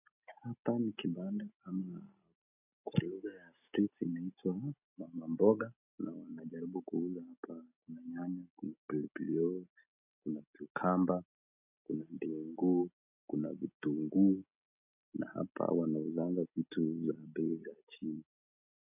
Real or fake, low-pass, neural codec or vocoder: real; 3.6 kHz; none